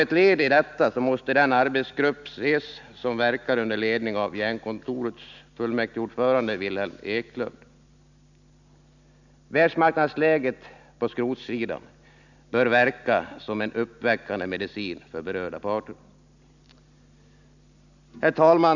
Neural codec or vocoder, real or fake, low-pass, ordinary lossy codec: none; real; 7.2 kHz; none